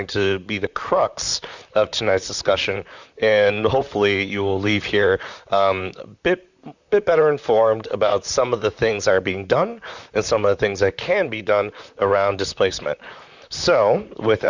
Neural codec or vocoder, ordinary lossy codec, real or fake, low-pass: vocoder, 44.1 kHz, 128 mel bands, Pupu-Vocoder; Opus, 64 kbps; fake; 7.2 kHz